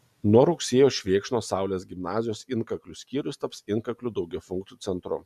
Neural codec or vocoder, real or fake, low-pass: none; real; 14.4 kHz